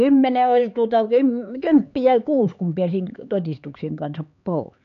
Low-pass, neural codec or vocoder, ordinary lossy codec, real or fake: 7.2 kHz; codec, 16 kHz, 4 kbps, X-Codec, HuBERT features, trained on LibriSpeech; none; fake